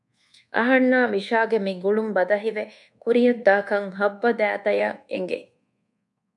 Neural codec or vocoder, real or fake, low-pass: codec, 24 kHz, 1.2 kbps, DualCodec; fake; 10.8 kHz